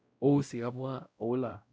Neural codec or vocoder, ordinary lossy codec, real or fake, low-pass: codec, 16 kHz, 0.5 kbps, X-Codec, WavLM features, trained on Multilingual LibriSpeech; none; fake; none